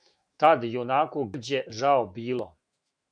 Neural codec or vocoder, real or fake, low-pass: autoencoder, 48 kHz, 128 numbers a frame, DAC-VAE, trained on Japanese speech; fake; 9.9 kHz